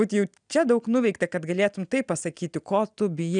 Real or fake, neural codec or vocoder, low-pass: real; none; 9.9 kHz